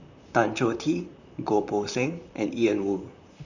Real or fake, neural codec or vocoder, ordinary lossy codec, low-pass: fake; vocoder, 44.1 kHz, 128 mel bands, Pupu-Vocoder; none; 7.2 kHz